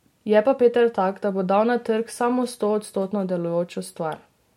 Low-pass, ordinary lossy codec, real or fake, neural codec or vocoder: 19.8 kHz; MP3, 64 kbps; real; none